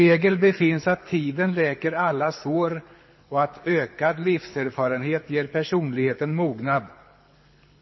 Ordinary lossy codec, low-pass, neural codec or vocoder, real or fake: MP3, 24 kbps; 7.2 kHz; codec, 24 kHz, 6 kbps, HILCodec; fake